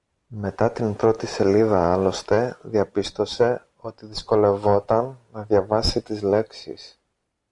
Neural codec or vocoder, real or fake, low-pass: none; real; 10.8 kHz